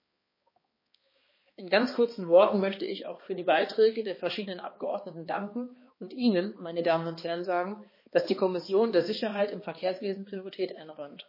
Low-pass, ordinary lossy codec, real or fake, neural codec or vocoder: 5.4 kHz; MP3, 24 kbps; fake; codec, 16 kHz, 2 kbps, X-Codec, HuBERT features, trained on balanced general audio